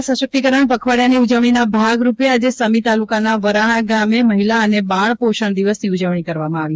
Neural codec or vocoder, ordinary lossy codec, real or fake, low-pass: codec, 16 kHz, 4 kbps, FreqCodec, smaller model; none; fake; none